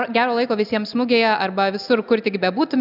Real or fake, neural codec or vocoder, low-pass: real; none; 5.4 kHz